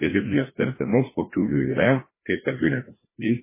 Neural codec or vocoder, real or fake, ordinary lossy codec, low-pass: codec, 16 kHz, 1 kbps, FreqCodec, larger model; fake; MP3, 16 kbps; 3.6 kHz